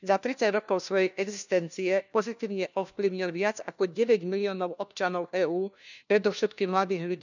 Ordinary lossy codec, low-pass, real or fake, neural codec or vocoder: none; 7.2 kHz; fake; codec, 16 kHz, 1 kbps, FunCodec, trained on LibriTTS, 50 frames a second